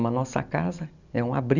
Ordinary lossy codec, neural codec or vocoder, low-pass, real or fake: none; none; 7.2 kHz; real